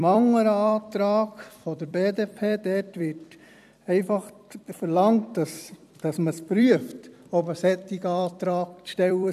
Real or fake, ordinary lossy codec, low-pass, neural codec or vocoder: fake; none; 14.4 kHz; vocoder, 44.1 kHz, 128 mel bands every 256 samples, BigVGAN v2